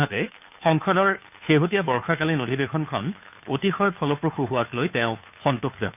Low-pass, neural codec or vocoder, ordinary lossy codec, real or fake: 3.6 kHz; codec, 16 kHz, 2 kbps, FunCodec, trained on Chinese and English, 25 frames a second; none; fake